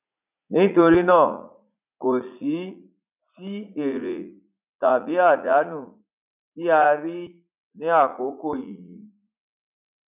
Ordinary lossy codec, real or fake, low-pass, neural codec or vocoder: none; fake; 3.6 kHz; vocoder, 44.1 kHz, 80 mel bands, Vocos